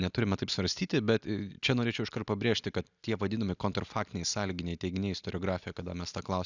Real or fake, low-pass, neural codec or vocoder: real; 7.2 kHz; none